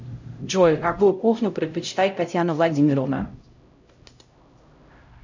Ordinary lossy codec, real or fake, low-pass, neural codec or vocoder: MP3, 64 kbps; fake; 7.2 kHz; codec, 16 kHz, 0.5 kbps, X-Codec, HuBERT features, trained on LibriSpeech